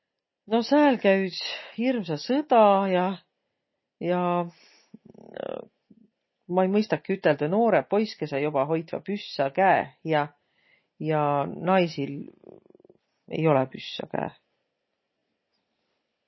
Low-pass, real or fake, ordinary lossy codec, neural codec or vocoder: 7.2 kHz; real; MP3, 24 kbps; none